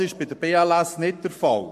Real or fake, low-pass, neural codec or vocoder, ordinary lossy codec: fake; 14.4 kHz; codec, 44.1 kHz, 7.8 kbps, Pupu-Codec; MP3, 64 kbps